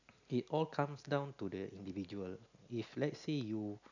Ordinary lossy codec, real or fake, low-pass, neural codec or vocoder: none; real; 7.2 kHz; none